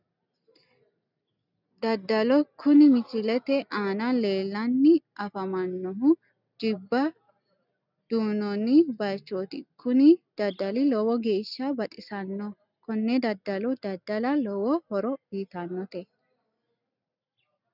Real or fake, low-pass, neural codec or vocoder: real; 5.4 kHz; none